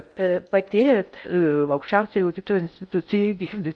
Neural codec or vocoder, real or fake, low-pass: codec, 16 kHz in and 24 kHz out, 0.6 kbps, FocalCodec, streaming, 2048 codes; fake; 9.9 kHz